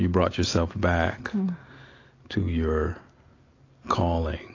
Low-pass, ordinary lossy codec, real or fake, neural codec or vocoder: 7.2 kHz; AAC, 32 kbps; real; none